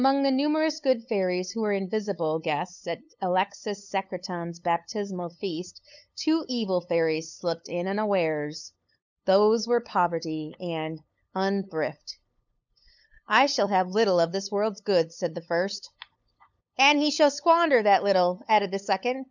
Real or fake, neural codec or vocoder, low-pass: fake; codec, 16 kHz, 8 kbps, FunCodec, trained on LibriTTS, 25 frames a second; 7.2 kHz